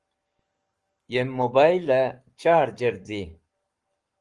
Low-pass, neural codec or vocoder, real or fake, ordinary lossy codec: 10.8 kHz; codec, 44.1 kHz, 7.8 kbps, Pupu-Codec; fake; Opus, 24 kbps